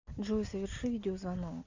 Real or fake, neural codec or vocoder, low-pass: real; none; 7.2 kHz